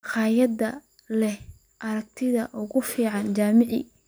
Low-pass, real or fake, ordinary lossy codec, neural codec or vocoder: none; real; none; none